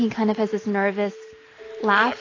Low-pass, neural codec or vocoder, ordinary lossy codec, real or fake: 7.2 kHz; none; AAC, 32 kbps; real